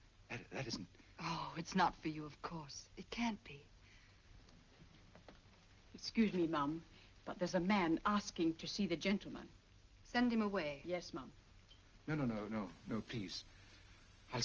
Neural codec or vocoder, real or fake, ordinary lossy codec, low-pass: none; real; Opus, 24 kbps; 7.2 kHz